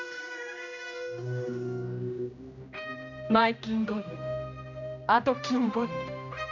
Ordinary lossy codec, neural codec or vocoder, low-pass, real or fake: none; codec, 16 kHz, 1 kbps, X-Codec, HuBERT features, trained on general audio; 7.2 kHz; fake